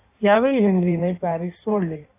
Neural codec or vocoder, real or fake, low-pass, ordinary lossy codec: codec, 16 kHz in and 24 kHz out, 1.1 kbps, FireRedTTS-2 codec; fake; 3.6 kHz; AAC, 16 kbps